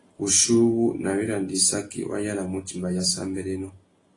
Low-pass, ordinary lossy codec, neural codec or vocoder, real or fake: 10.8 kHz; AAC, 32 kbps; none; real